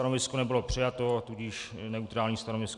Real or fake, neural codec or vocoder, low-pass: real; none; 10.8 kHz